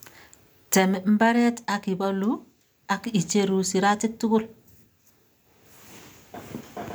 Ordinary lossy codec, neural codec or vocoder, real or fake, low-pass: none; none; real; none